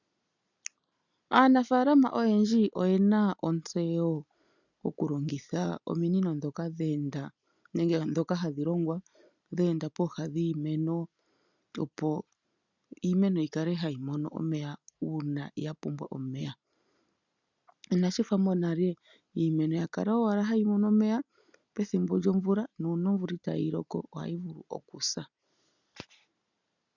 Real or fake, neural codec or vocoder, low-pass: real; none; 7.2 kHz